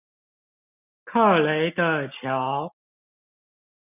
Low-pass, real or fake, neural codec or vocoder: 3.6 kHz; real; none